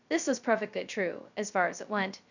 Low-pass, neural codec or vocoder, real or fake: 7.2 kHz; codec, 16 kHz, 0.2 kbps, FocalCodec; fake